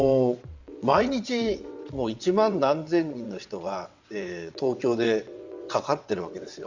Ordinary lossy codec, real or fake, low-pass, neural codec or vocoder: Opus, 64 kbps; fake; 7.2 kHz; vocoder, 22.05 kHz, 80 mel bands, WaveNeXt